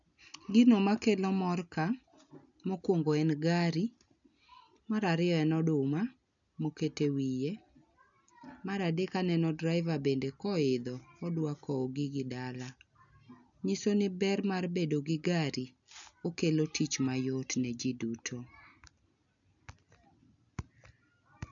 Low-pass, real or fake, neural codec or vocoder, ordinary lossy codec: 7.2 kHz; real; none; none